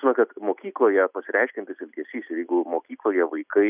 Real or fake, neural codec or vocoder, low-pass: real; none; 3.6 kHz